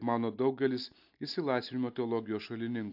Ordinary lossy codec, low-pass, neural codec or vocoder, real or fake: AAC, 48 kbps; 5.4 kHz; none; real